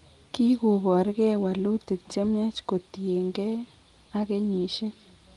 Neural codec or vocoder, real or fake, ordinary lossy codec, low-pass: none; real; Opus, 24 kbps; 10.8 kHz